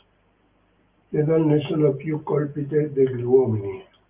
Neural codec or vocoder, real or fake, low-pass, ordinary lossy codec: none; real; 3.6 kHz; Opus, 24 kbps